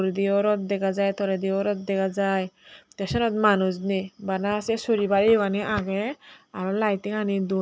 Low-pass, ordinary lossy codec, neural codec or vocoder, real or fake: none; none; none; real